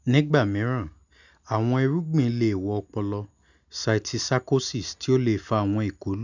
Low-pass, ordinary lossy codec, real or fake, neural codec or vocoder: 7.2 kHz; none; real; none